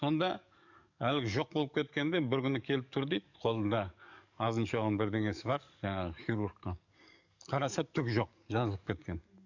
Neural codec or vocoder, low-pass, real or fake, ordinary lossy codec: codec, 44.1 kHz, 7.8 kbps, DAC; 7.2 kHz; fake; none